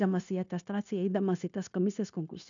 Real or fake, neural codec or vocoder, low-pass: fake; codec, 16 kHz, 0.9 kbps, LongCat-Audio-Codec; 7.2 kHz